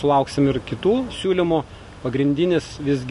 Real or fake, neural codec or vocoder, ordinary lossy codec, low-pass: real; none; MP3, 48 kbps; 14.4 kHz